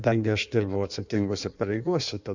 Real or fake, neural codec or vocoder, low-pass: fake; codec, 16 kHz in and 24 kHz out, 1.1 kbps, FireRedTTS-2 codec; 7.2 kHz